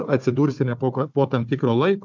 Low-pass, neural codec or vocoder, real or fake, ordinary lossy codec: 7.2 kHz; codec, 16 kHz, 4 kbps, FunCodec, trained on Chinese and English, 50 frames a second; fake; MP3, 64 kbps